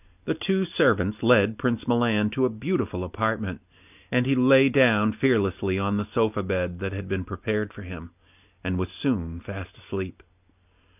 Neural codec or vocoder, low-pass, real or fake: none; 3.6 kHz; real